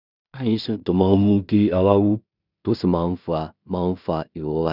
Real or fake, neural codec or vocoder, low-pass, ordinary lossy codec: fake; codec, 16 kHz in and 24 kHz out, 0.4 kbps, LongCat-Audio-Codec, two codebook decoder; 5.4 kHz; none